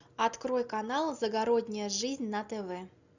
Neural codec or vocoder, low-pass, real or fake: none; 7.2 kHz; real